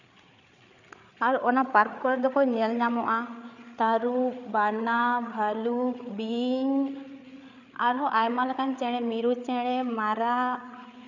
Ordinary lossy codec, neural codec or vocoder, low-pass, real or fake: none; codec, 16 kHz, 8 kbps, FreqCodec, larger model; 7.2 kHz; fake